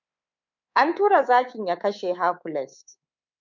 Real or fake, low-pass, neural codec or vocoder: fake; 7.2 kHz; codec, 24 kHz, 3.1 kbps, DualCodec